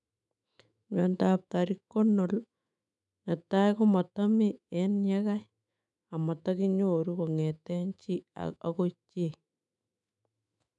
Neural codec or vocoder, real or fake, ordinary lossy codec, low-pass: autoencoder, 48 kHz, 128 numbers a frame, DAC-VAE, trained on Japanese speech; fake; none; 10.8 kHz